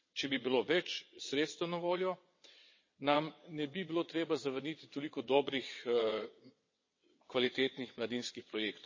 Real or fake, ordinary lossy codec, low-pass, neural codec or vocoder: fake; MP3, 32 kbps; 7.2 kHz; vocoder, 22.05 kHz, 80 mel bands, WaveNeXt